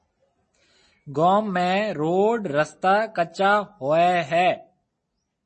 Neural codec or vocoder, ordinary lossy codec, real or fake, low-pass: none; MP3, 32 kbps; real; 9.9 kHz